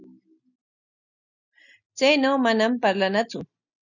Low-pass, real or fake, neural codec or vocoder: 7.2 kHz; real; none